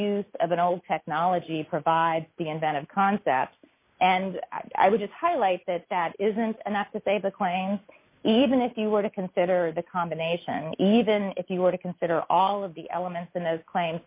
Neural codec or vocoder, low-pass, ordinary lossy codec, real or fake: none; 3.6 kHz; MP3, 32 kbps; real